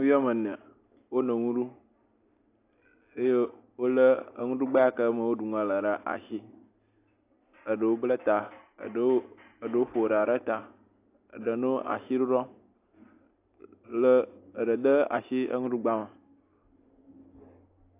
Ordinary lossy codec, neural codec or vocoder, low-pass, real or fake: AAC, 24 kbps; none; 3.6 kHz; real